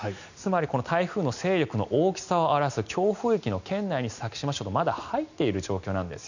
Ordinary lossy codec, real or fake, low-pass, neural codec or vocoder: none; real; 7.2 kHz; none